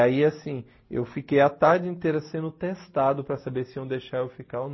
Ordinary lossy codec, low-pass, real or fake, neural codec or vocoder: MP3, 24 kbps; 7.2 kHz; real; none